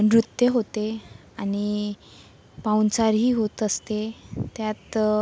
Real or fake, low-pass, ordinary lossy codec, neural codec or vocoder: real; none; none; none